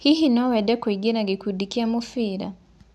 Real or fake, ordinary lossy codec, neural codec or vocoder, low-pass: real; none; none; none